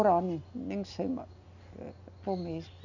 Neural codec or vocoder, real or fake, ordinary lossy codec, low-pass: none; real; none; 7.2 kHz